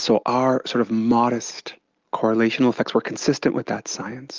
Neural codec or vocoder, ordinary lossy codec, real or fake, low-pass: none; Opus, 32 kbps; real; 7.2 kHz